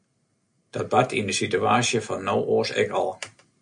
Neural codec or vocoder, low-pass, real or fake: none; 9.9 kHz; real